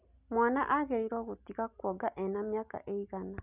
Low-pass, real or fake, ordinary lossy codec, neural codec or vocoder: 3.6 kHz; real; none; none